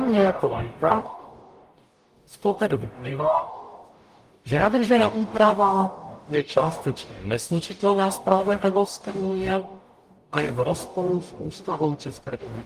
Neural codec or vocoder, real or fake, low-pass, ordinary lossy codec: codec, 44.1 kHz, 0.9 kbps, DAC; fake; 14.4 kHz; Opus, 32 kbps